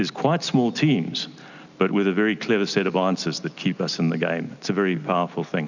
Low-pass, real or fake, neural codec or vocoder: 7.2 kHz; real; none